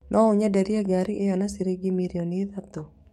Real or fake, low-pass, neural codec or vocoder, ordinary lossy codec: fake; 19.8 kHz; codec, 44.1 kHz, 7.8 kbps, DAC; MP3, 64 kbps